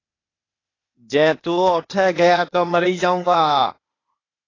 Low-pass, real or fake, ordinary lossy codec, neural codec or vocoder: 7.2 kHz; fake; AAC, 32 kbps; codec, 16 kHz, 0.8 kbps, ZipCodec